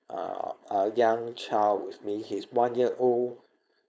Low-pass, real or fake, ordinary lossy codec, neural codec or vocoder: none; fake; none; codec, 16 kHz, 4.8 kbps, FACodec